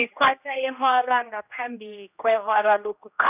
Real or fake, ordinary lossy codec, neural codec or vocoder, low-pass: fake; none; codec, 16 kHz, 1 kbps, X-Codec, HuBERT features, trained on general audio; 3.6 kHz